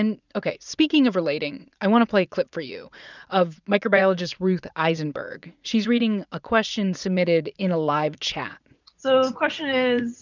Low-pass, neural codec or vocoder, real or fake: 7.2 kHz; vocoder, 44.1 kHz, 80 mel bands, Vocos; fake